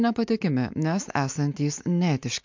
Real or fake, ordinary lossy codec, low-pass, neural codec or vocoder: real; MP3, 64 kbps; 7.2 kHz; none